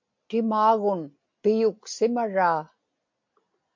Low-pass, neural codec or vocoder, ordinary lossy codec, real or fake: 7.2 kHz; none; MP3, 48 kbps; real